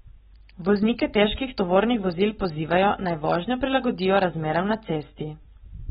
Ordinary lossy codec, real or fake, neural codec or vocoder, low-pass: AAC, 16 kbps; real; none; 19.8 kHz